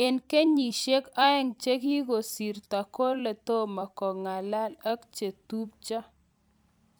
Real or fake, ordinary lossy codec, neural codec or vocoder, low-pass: fake; none; vocoder, 44.1 kHz, 128 mel bands every 256 samples, BigVGAN v2; none